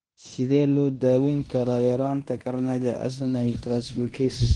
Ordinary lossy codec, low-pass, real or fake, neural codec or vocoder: Opus, 24 kbps; 10.8 kHz; fake; codec, 16 kHz in and 24 kHz out, 0.9 kbps, LongCat-Audio-Codec, fine tuned four codebook decoder